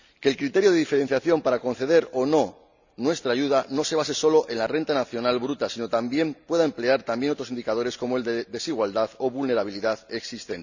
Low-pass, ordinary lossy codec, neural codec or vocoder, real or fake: 7.2 kHz; none; none; real